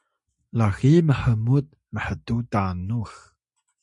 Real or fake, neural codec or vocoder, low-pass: real; none; 10.8 kHz